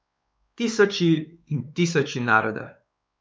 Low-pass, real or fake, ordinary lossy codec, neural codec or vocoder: none; fake; none; codec, 16 kHz, 4 kbps, X-Codec, WavLM features, trained on Multilingual LibriSpeech